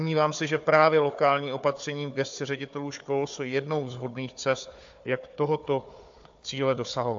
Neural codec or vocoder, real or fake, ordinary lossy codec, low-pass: codec, 16 kHz, 4 kbps, FunCodec, trained on Chinese and English, 50 frames a second; fake; AAC, 64 kbps; 7.2 kHz